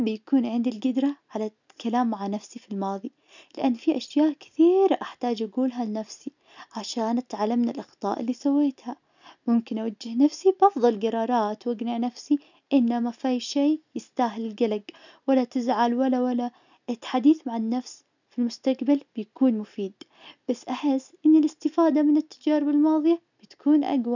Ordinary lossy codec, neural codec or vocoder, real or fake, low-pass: none; none; real; 7.2 kHz